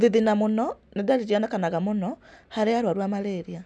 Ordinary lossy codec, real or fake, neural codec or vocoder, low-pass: none; real; none; none